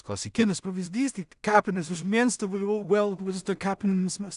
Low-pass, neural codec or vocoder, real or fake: 10.8 kHz; codec, 16 kHz in and 24 kHz out, 0.4 kbps, LongCat-Audio-Codec, two codebook decoder; fake